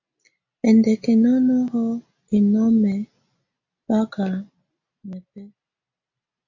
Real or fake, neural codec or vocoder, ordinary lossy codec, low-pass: real; none; AAC, 48 kbps; 7.2 kHz